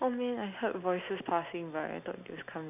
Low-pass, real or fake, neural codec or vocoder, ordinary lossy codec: 3.6 kHz; real; none; none